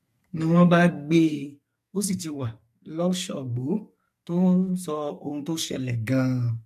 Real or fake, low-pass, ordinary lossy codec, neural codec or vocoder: fake; 14.4 kHz; MP3, 64 kbps; codec, 44.1 kHz, 2.6 kbps, SNAC